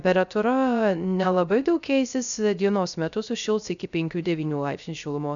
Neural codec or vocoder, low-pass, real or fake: codec, 16 kHz, 0.3 kbps, FocalCodec; 7.2 kHz; fake